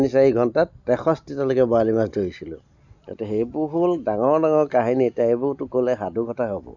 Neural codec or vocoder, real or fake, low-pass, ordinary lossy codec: none; real; 7.2 kHz; none